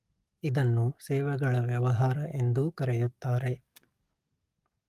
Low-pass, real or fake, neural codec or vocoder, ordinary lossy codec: 14.4 kHz; fake; codec, 44.1 kHz, 7.8 kbps, DAC; Opus, 32 kbps